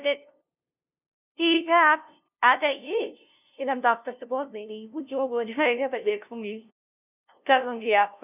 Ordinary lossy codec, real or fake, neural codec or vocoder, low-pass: none; fake; codec, 16 kHz, 0.5 kbps, FunCodec, trained on LibriTTS, 25 frames a second; 3.6 kHz